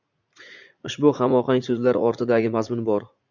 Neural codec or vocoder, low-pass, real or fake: vocoder, 44.1 kHz, 80 mel bands, Vocos; 7.2 kHz; fake